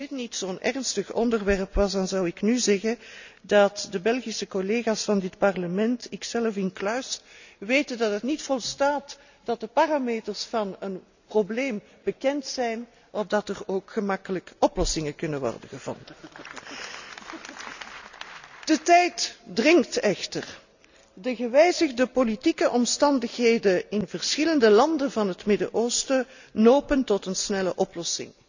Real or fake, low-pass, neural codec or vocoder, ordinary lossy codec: real; 7.2 kHz; none; none